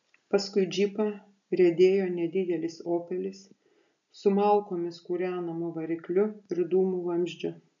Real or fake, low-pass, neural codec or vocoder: real; 7.2 kHz; none